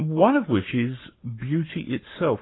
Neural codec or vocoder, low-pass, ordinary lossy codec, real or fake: codec, 44.1 kHz, 7.8 kbps, Pupu-Codec; 7.2 kHz; AAC, 16 kbps; fake